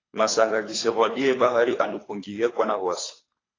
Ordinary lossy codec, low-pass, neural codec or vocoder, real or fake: AAC, 32 kbps; 7.2 kHz; codec, 24 kHz, 3 kbps, HILCodec; fake